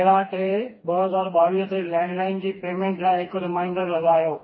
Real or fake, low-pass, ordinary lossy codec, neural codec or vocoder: fake; 7.2 kHz; MP3, 24 kbps; codec, 16 kHz, 2 kbps, FreqCodec, smaller model